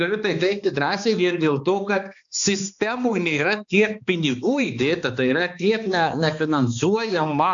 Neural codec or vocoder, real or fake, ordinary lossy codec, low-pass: codec, 16 kHz, 2 kbps, X-Codec, HuBERT features, trained on balanced general audio; fake; AAC, 64 kbps; 7.2 kHz